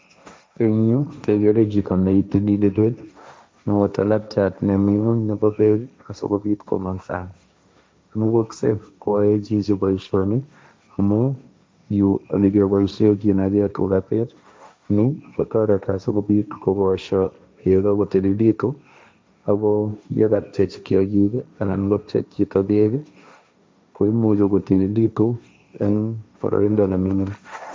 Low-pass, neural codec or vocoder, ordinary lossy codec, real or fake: none; codec, 16 kHz, 1.1 kbps, Voila-Tokenizer; none; fake